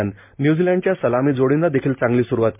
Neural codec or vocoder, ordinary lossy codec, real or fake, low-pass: none; MP3, 32 kbps; real; 3.6 kHz